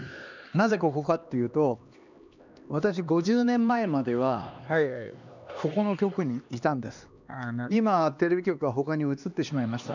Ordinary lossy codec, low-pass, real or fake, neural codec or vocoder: none; 7.2 kHz; fake; codec, 16 kHz, 2 kbps, X-Codec, HuBERT features, trained on LibriSpeech